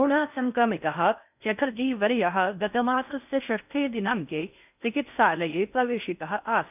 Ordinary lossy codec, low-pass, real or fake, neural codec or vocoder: none; 3.6 kHz; fake; codec, 16 kHz in and 24 kHz out, 0.6 kbps, FocalCodec, streaming, 4096 codes